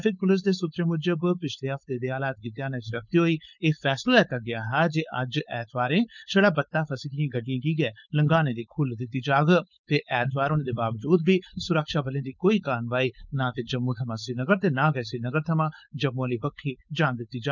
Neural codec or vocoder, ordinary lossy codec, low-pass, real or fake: codec, 16 kHz, 4.8 kbps, FACodec; none; 7.2 kHz; fake